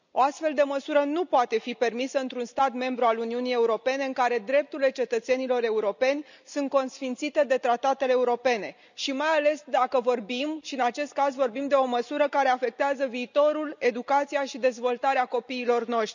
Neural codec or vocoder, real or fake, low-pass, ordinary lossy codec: none; real; 7.2 kHz; none